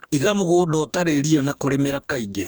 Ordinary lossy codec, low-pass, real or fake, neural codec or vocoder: none; none; fake; codec, 44.1 kHz, 2.6 kbps, DAC